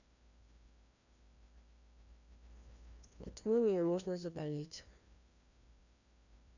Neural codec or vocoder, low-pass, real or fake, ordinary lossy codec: codec, 16 kHz, 1 kbps, FreqCodec, larger model; 7.2 kHz; fake; none